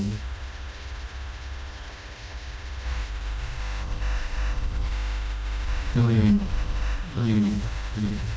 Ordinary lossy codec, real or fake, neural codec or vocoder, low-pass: none; fake; codec, 16 kHz, 1 kbps, FreqCodec, smaller model; none